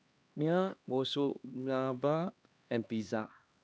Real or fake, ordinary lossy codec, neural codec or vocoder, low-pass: fake; none; codec, 16 kHz, 2 kbps, X-Codec, HuBERT features, trained on LibriSpeech; none